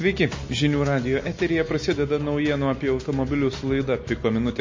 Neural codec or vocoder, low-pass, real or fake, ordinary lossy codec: none; 7.2 kHz; real; MP3, 32 kbps